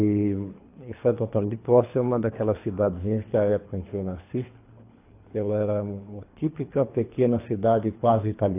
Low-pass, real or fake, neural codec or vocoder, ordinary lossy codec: 3.6 kHz; fake; codec, 24 kHz, 3 kbps, HILCodec; AAC, 24 kbps